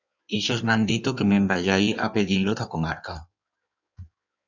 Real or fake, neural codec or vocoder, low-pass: fake; codec, 16 kHz in and 24 kHz out, 1.1 kbps, FireRedTTS-2 codec; 7.2 kHz